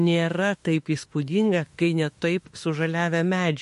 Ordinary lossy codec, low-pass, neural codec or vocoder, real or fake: MP3, 48 kbps; 14.4 kHz; autoencoder, 48 kHz, 32 numbers a frame, DAC-VAE, trained on Japanese speech; fake